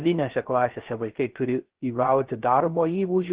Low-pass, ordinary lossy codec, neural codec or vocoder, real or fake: 3.6 kHz; Opus, 16 kbps; codec, 16 kHz, 0.3 kbps, FocalCodec; fake